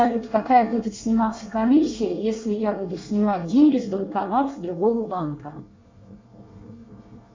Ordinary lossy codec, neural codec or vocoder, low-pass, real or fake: MP3, 64 kbps; codec, 24 kHz, 1 kbps, SNAC; 7.2 kHz; fake